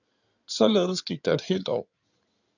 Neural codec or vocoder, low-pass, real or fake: codec, 16 kHz in and 24 kHz out, 2.2 kbps, FireRedTTS-2 codec; 7.2 kHz; fake